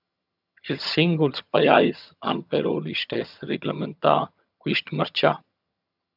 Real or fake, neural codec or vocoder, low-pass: fake; vocoder, 22.05 kHz, 80 mel bands, HiFi-GAN; 5.4 kHz